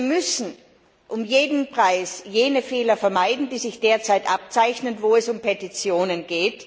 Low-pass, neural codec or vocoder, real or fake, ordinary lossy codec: none; none; real; none